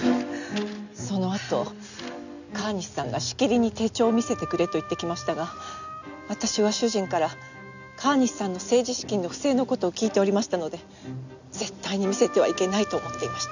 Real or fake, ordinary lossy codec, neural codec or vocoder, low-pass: real; none; none; 7.2 kHz